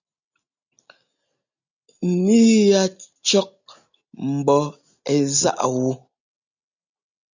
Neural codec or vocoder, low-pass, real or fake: none; 7.2 kHz; real